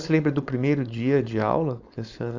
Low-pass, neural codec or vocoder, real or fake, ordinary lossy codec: 7.2 kHz; codec, 16 kHz, 4.8 kbps, FACodec; fake; AAC, 48 kbps